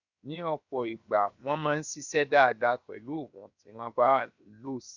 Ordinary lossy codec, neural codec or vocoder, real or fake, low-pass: none; codec, 16 kHz, 0.7 kbps, FocalCodec; fake; 7.2 kHz